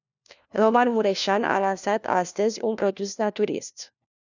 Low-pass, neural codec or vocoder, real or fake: 7.2 kHz; codec, 16 kHz, 1 kbps, FunCodec, trained on LibriTTS, 50 frames a second; fake